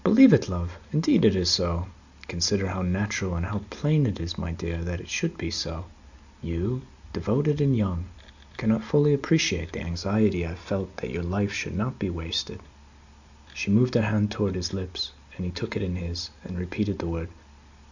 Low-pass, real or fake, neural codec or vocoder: 7.2 kHz; real; none